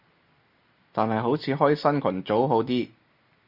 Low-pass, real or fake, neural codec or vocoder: 5.4 kHz; real; none